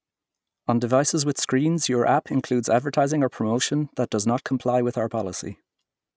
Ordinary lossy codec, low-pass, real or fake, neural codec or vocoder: none; none; real; none